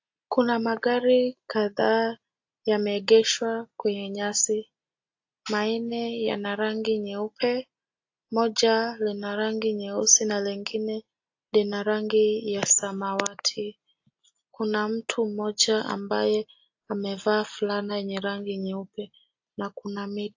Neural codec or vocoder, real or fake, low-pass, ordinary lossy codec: none; real; 7.2 kHz; AAC, 48 kbps